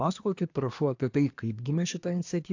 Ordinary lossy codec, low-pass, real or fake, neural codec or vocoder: AAC, 48 kbps; 7.2 kHz; fake; codec, 24 kHz, 1 kbps, SNAC